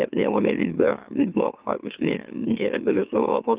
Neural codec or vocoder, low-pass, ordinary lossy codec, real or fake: autoencoder, 44.1 kHz, a latent of 192 numbers a frame, MeloTTS; 3.6 kHz; Opus, 32 kbps; fake